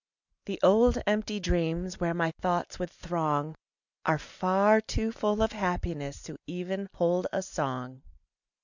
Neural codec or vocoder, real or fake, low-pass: none; real; 7.2 kHz